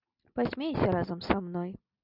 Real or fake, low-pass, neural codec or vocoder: real; 5.4 kHz; none